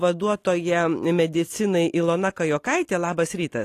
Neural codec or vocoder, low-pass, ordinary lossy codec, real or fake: none; 14.4 kHz; AAC, 48 kbps; real